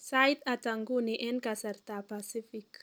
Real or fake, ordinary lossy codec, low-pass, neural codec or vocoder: real; none; none; none